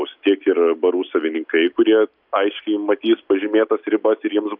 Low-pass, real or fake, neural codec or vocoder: 5.4 kHz; real; none